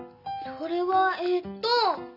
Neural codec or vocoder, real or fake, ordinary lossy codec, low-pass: none; real; none; 5.4 kHz